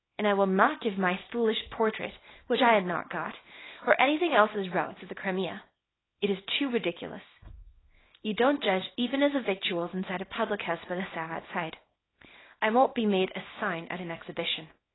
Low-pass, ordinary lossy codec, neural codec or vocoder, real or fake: 7.2 kHz; AAC, 16 kbps; codec, 24 kHz, 0.9 kbps, WavTokenizer, small release; fake